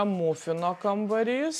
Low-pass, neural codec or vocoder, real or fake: 14.4 kHz; none; real